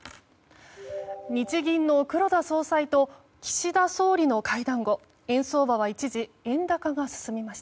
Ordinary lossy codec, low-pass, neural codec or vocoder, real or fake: none; none; none; real